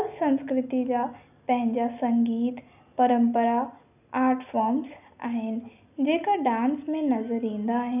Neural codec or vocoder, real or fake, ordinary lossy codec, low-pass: none; real; none; 3.6 kHz